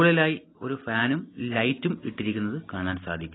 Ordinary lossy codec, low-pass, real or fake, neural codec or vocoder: AAC, 16 kbps; 7.2 kHz; real; none